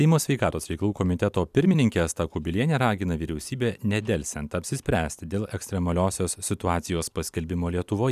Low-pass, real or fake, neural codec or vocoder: 14.4 kHz; fake; vocoder, 44.1 kHz, 128 mel bands every 256 samples, BigVGAN v2